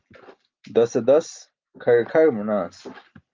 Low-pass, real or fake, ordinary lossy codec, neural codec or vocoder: 7.2 kHz; real; Opus, 24 kbps; none